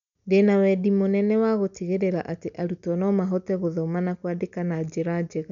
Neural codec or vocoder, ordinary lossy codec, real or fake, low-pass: none; none; real; 7.2 kHz